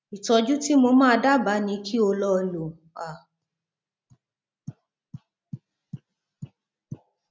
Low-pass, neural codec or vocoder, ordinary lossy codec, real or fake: none; none; none; real